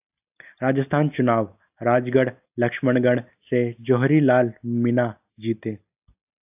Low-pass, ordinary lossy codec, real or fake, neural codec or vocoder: 3.6 kHz; AAC, 32 kbps; real; none